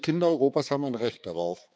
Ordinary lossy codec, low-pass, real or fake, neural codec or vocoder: none; none; fake; codec, 16 kHz, 4 kbps, X-Codec, HuBERT features, trained on balanced general audio